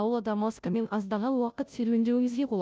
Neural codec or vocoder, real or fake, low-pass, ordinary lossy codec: codec, 16 kHz, 0.5 kbps, FunCodec, trained on Chinese and English, 25 frames a second; fake; none; none